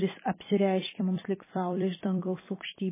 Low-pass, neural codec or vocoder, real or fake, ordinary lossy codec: 3.6 kHz; vocoder, 44.1 kHz, 128 mel bands every 256 samples, BigVGAN v2; fake; MP3, 16 kbps